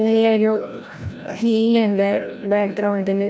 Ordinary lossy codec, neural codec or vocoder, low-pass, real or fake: none; codec, 16 kHz, 0.5 kbps, FreqCodec, larger model; none; fake